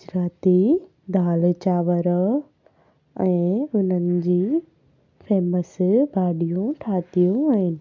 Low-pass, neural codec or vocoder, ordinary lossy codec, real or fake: 7.2 kHz; none; MP3, 64 kbps; real